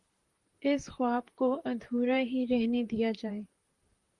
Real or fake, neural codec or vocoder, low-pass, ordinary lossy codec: fake; vocoder, 44.1 kHz, 128 mel bands, Pupu-Vocoder; 10.8 kHz; Opus, 24 kbps